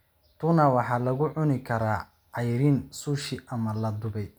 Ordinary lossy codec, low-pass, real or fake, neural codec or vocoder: none; none; real; none